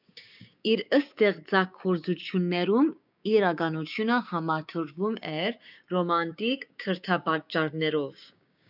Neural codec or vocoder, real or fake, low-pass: codec, 16 kHz, 6 kbps, DAC; fake; 5.4 kHz